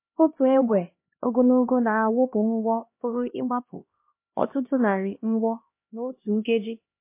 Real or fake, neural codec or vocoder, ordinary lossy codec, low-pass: fake; codec, 16 kHz, 1 kbps, X-Codec, HuBERT features, trained on LibriSpeech; MP3, 24 kbps; 3.6 kHz